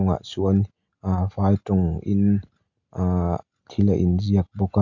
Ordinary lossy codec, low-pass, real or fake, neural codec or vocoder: none; 7.2 kHz; real; none